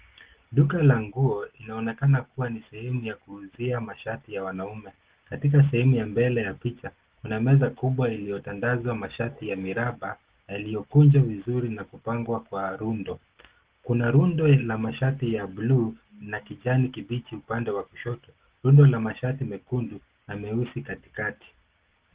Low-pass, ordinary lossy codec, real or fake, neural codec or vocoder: 3.6 kHz; Opus, 16 kbps; real; none